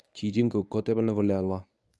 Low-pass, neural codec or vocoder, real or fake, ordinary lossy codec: none; codec, 24 kHz, 0.9 kbps, WavTokenizer, medium speech release version 1; fake; none